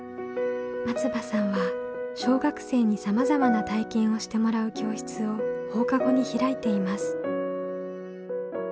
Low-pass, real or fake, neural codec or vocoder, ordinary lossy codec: none; real; none; none